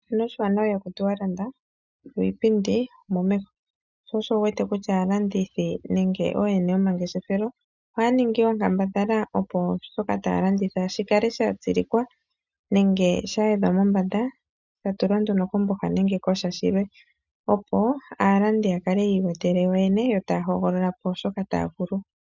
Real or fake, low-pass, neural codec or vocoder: real; 7.2 kHz; none